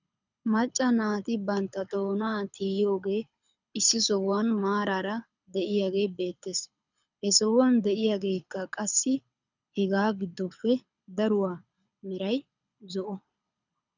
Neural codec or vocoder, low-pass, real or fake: codec, 24 kHz, 6 kbps, HILCodec; 7.2 kHz; fake